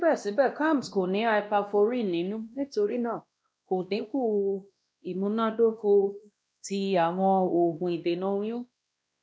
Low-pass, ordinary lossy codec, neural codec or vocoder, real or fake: none; none; codec, 16 kHz, 1 kbps, X-Codec, WavLM features, trained on Multilingual LibriSpeech; fake